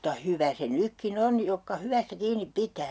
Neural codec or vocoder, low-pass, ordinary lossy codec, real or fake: none; none; none; real